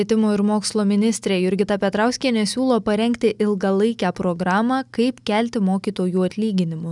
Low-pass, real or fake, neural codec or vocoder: 10.8 kHz; real; none